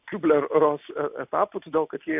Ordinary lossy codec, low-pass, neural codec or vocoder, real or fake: AAC, 32 kbps; 3.6 kHz; none; real